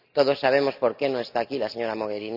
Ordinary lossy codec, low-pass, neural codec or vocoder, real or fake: none; 5.4 kHz; none; real